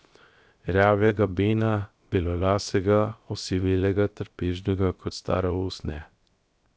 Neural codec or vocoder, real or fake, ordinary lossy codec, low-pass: codec, 16 kHz, 0.7 kbps, FocalCodec; fake; none; none